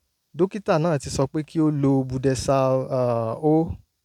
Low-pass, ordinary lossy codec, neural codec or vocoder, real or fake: 19.8 kHz; none; none; real